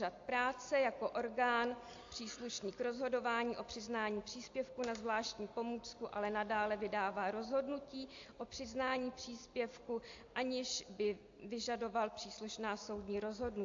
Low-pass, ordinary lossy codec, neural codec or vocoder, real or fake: 7.2 kHz; AAC, 48 kbps; none; real